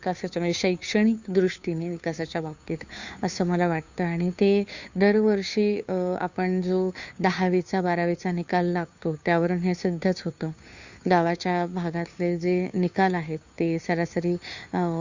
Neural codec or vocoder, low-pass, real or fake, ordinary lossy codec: codec, 16 kHz, 2 kbps, FunCodec, trained on Chinese and English, 25 frames a second; 7.2 kHz; fake; Opus, 64 kbps